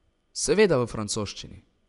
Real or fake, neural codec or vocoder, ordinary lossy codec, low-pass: fake; vocoder, 24 kHz, 100 mel bands, Vocos; none; 10.8 kHz